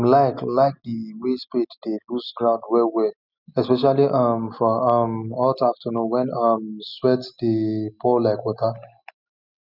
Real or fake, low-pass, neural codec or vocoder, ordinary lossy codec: real; 5.4 kHz; none; none